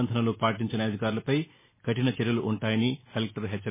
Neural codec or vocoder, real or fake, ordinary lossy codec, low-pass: none; real; MP3, 16 kbps; 3.6 kHz